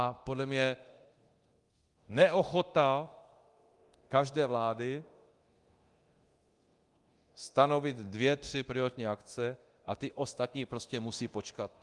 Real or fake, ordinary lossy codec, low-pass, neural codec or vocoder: fake; Opus, 24 kbps; 10.8 kHz; codec, 24 kHz, 0.9 kbps, DualCodec